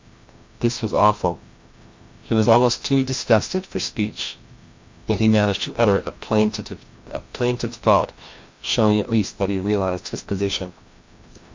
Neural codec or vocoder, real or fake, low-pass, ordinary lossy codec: codec, 16 kHz, 1 kbps, FreqCodec, larger model; fake; 7.2 kHz; MP3, 48 kbps